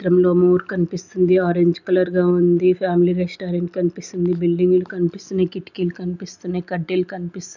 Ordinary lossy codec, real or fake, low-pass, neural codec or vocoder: none; real; 7.2 kHz; none